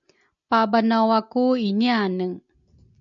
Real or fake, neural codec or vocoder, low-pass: real; none; 7.2 kHz